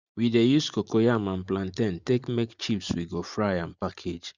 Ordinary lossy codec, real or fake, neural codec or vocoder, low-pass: Opus, 64 kbps; fake; vocoder, 44.1 kHz, 128 mel bands every 512 samples, BigVGAN v2; 7.2 kHz